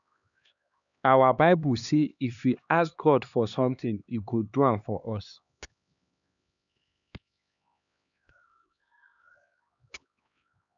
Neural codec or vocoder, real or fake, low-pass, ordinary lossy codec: codec, 16 kHz, 2 kbps, X-Codec, HuBERT features, trained on LibriSpeech; fake; 7.2 kHz; MP3, 96 kbps